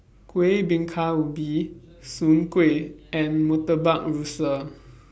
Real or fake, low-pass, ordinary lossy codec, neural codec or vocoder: real; none; none; none